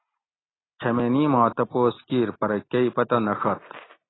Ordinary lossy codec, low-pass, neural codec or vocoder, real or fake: AAC, 16 kbps; 7.2 kHz; none; real